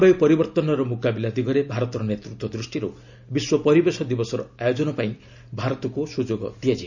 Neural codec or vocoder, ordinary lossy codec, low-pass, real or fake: none; none; 7.2 kHz; real